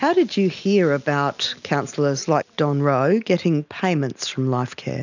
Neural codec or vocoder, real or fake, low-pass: none; real; 7.2 kHz